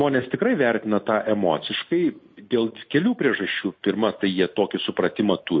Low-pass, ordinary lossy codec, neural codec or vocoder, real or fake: 7.2 kHz; MP3, 32 kbps; none; real